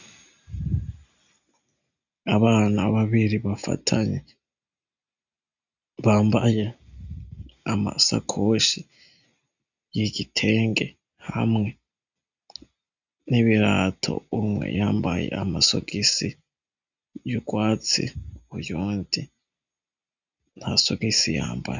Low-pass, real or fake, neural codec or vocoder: 7.2 kHz; real; none